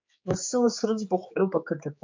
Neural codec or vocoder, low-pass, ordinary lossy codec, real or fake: codec, 16 kHz, 4 kbps, X-Codec, HuBERT features, trained on general audio; 7.2 kHz; MP3, 48 kbps; fake